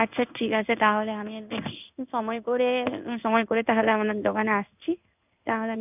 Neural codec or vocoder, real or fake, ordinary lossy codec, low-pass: codec, 16 kHz in and 24 kHz out, 1 kbps, XY-Tokenizer; fake; none; 3.6 kHz